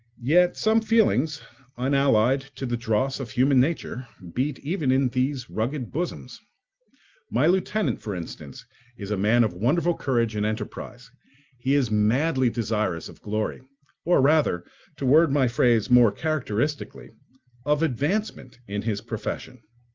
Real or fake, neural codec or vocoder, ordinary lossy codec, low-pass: real; none; Opus, 24 kbps; 7.2 kHz